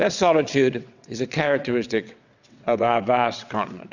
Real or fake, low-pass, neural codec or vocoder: fake; 7.2 kHz; vocoder, 22.05 kHz, 80 mel bands, WaveNeXt